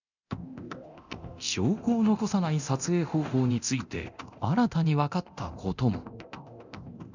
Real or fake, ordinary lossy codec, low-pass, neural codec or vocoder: fake; none; 7.2 kHz; codec, 24 kHz, 0.9 kbps, DualCodec